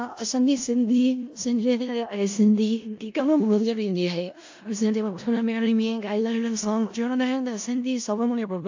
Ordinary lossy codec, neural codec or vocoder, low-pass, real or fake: AAC, 48 kbps; codec, 16 kHz in and 24 kHz out, 0.4 kbps, LongCat-Audio-Codec, four codebook decoder; 7.2 kHz; fake